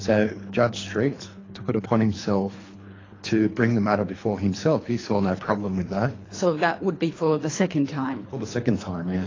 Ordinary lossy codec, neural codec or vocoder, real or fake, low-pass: AAC, 32 kbps; codec, 24 kHz, 3 kbps, HILCodec; fake; 7.2 kHz